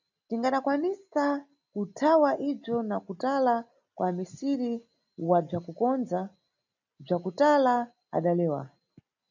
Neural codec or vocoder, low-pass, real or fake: none; 7.2 kHz; real